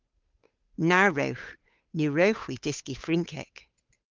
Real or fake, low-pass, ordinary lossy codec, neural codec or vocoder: fake; 7.2 kHz; Opus, 32 kbps; codec, 16 kHz, 8 kbps, FunCodec, trained on Chinese and English, 25 frames a second